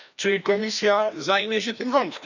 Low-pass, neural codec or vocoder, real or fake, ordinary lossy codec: 7.2 kHz; codec, 16 kHz, 1 kbps, FreqCodec, larger model; fake; none